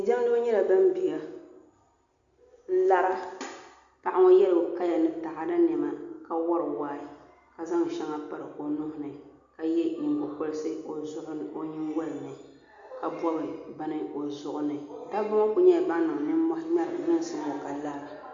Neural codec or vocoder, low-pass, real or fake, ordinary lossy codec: none; 7.2 kHz; real; Opus, 64 kbps